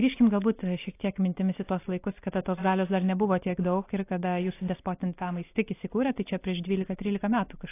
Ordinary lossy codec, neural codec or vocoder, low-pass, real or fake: AAC, 24 kbps; none; 3.6 kHz; real